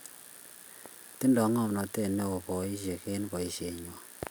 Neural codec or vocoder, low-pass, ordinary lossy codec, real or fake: none; none; none; real